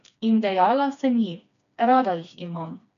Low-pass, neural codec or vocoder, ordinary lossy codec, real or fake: 7.2 kHz; codec, 16 kHz, 2 kbps, FreqCodec, smaller model; none; fake